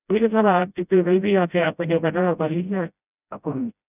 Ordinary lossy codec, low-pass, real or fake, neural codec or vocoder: none; 3.6 kHz; fake; codec, 16 kHz, 0.5 kbps, FreqCodec, smaller model